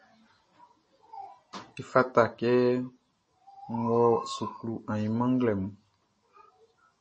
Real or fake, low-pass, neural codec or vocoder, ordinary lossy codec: real; 10.8 kHz; none; MP3, 32 kbps